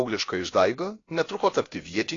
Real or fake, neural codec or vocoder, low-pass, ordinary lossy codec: fake; codec, 16 kHz, about 1 kbps, DyCAST, with the encoder's durations; 7.2 kHz; AAC, 32 kbps